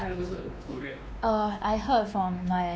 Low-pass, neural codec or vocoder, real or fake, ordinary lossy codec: none; codec, 16 kHz, 2 kbps, X-Codec, WavLM features, trained on Multilingual LibriSpeech; fake; none